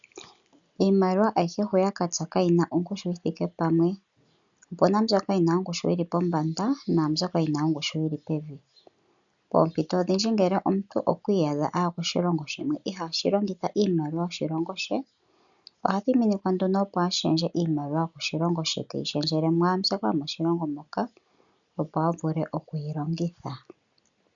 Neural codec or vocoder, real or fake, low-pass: none; real; 7.2 kHz